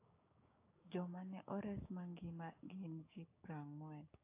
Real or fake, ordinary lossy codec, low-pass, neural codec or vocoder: fake; AAC, 32 kbps; 3.6 kHz; codec, 16 kHz, 6 kbps, DAC